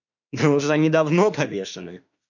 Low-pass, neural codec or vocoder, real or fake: 7.2 kHz; autoencoder, 48 kHz, 32 numbers a frame, DAC-VAE, trained on Japanese speech; fake